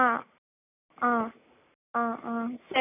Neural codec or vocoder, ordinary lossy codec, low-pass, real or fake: none; none; 3.6 kHz; real